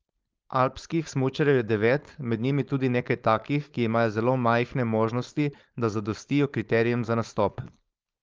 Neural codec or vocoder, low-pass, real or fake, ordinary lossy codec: codec, 16 kHz, 4.8 kbps, FACodec; 7.2 kHz; fake; Opus, 24 kbps